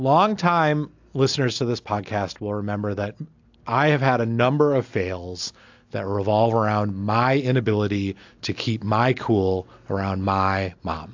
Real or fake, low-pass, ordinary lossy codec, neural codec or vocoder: real; 7.2 kHz; AAC, 48 kbps; none